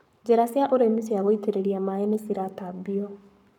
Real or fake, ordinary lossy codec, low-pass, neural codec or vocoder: fake; none; 19.8 kHz; codec, 44.1 kHz, 7.8 kbps, Pupu-Codec